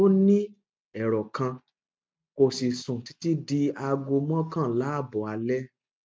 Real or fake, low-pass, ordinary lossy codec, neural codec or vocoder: real; none; none; none